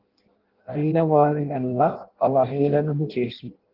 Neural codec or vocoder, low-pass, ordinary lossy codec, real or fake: codec, 16 kHz in and 24 kHz out, 0.6 kbps, FireRedTTS-2 codec; 5.4 kHz; Opus, 16 kbps; fake